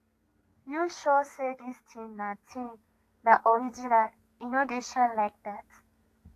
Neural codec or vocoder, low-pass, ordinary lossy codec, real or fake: codec, 32 kHz, 1.9 kbps, SNAC; 14.4 kHz; AAC, 64 kbps; fake